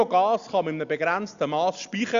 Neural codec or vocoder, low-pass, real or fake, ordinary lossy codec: none; 7.2 kHz; real; Opus, 64 kbps